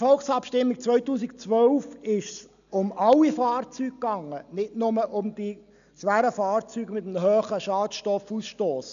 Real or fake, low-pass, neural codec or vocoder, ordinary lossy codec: real; 7.2 kHz; none; none